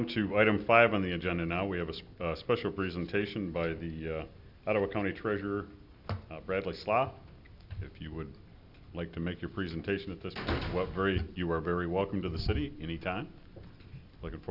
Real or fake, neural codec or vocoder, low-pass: real; none; 5.4 kHz